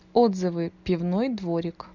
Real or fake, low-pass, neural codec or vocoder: real; 7.2 kHz; none